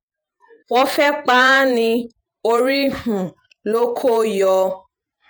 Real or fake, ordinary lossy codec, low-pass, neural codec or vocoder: fake; none; none; vocoder, 48 kHz, 128 mel bands, Vocos